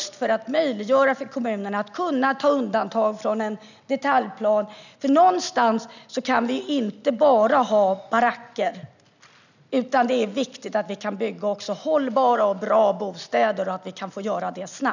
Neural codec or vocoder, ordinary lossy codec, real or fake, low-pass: none; none; real; 7.2 kHz